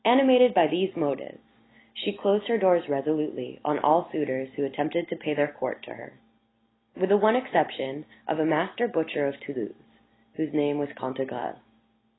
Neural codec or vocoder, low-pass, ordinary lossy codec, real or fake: none; 7.2 kHz; AAC, 16 kbps; real